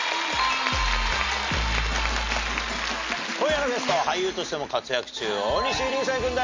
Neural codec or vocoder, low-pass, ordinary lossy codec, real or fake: none; 7.2 kHz; MP3, 48 kbps; real